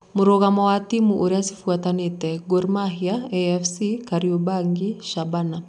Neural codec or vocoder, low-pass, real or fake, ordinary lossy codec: none; 10.8 kHz; real; none